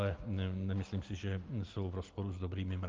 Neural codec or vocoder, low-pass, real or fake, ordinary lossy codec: none; 7.2 kHz; real; Opus, 24 kbps